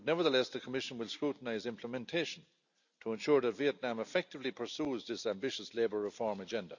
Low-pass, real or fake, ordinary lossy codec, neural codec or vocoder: 7.2 kHz; real; none; none